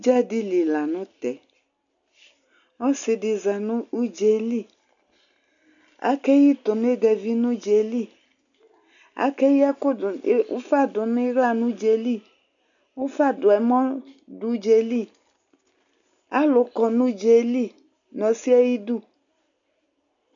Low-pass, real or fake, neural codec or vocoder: 7.2 kHz; real; none